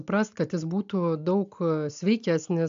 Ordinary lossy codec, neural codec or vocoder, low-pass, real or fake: AAC, 64 kbps; none; 7.2 kHz; real